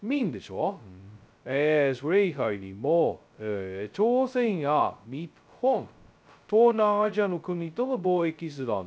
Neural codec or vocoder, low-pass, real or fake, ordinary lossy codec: codec, 16 kHz, 0.2 kbps, FocalCodec; none; fake; none